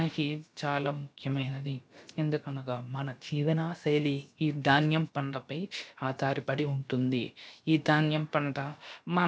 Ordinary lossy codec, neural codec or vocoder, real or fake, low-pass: none; codec, 16 kHz, about 1 kbps, DyCAST, with the encoder's durations; fake; none